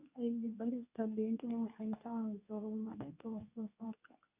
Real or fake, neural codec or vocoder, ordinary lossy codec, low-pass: fake; codec, 24 kHz, 0.9 kbps, WavTokenizer, medium speech release version 2; none; 3.6 kHz